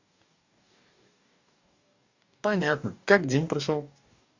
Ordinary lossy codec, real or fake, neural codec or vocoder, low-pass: Opus, 64 kbps; fake; codec, 44.1 kHz, 2.6 kbps, DAC; 7.2 kHz